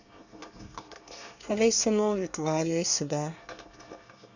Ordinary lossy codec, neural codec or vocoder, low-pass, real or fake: none; codec, 24 kHz, 1 kbps, SNAC; 7.2 kHz; fake